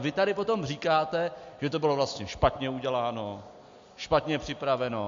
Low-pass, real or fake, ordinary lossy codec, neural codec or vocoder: 7.2 kHz; real; MP3, 48 kbps; none